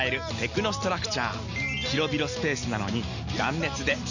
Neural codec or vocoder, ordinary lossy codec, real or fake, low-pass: none; none; real; 7.2 kHz